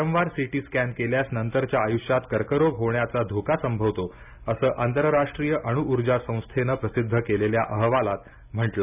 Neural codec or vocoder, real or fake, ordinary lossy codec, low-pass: none; real; none; 3.6 kHz